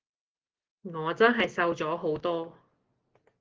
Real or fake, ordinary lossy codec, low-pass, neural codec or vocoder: real; Opus, 16 kbps; 7.2 kHz; none